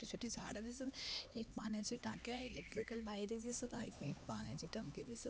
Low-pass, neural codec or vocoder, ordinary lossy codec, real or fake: none; codec, 16 kHz, 2 kbps, X-Codec, HuBERT features, trained on LibriSpeech; none; fake